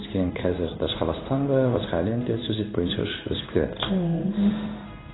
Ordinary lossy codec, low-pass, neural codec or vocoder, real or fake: AAC, 16 kbps; 7.2 kHz; codec, 16 kHz in and 24 kHz out, 1 kbps, XY-Tokenizer; fake